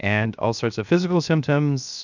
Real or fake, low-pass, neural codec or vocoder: fake; 7.2 kHz; codec, 16 kHz, 0.7 kbps, FocalCodec